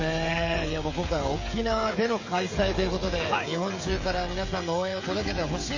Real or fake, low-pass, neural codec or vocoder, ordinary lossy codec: fake; 7.2 kHz; codec, 16 kHz, 16 kbps, FreqCodec, smaller model; MP3, 32 kbps